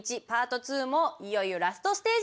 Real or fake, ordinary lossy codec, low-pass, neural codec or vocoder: real; none; none; none